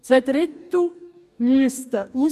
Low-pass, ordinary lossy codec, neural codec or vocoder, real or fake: 14.4 kHz; none; codec, 44.1 kHz, 2.6 kbps, DAC; fake